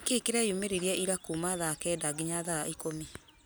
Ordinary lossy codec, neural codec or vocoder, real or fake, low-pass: none; none; real; none